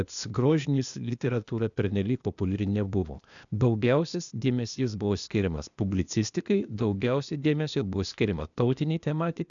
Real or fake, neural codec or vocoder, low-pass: fake; codec, 16 kHz, 0.8 kbps, ZipCodec; 7.2 kHz